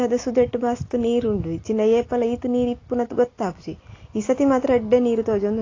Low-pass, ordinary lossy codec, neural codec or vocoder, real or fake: 7.2 kHz; AAC, 32 kbps; none; real